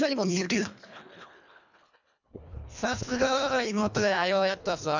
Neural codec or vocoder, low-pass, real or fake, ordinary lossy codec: codec, 24 kHz, 1.5 kbps, HILCodec; 7.2 kHz; fake; none